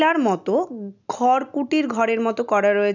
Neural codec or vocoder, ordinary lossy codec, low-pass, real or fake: none; none; 7.2 kHz; real